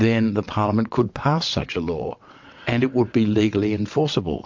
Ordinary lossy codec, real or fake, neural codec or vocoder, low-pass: MP3, 48 kbps; fake; vocoder, 22.05 kHz, 80 mel bands, WaveNeXt; 7.2 kHz